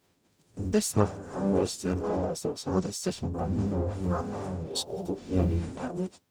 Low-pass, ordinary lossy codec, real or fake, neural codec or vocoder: none; none; fake; codec, 44.1 kHz, 0.9 kbps, DAC